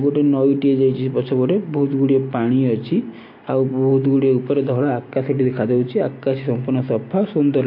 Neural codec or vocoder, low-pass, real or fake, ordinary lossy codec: none; 5.4 kHz; real; MP3, 32 kbps